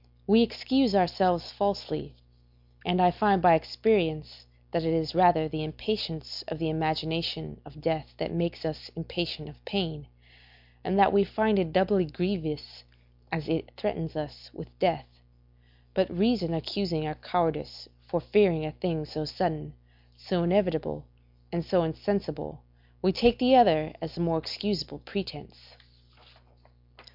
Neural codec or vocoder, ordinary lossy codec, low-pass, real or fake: none; MP3, 48 kbps; 5.4 kHz; real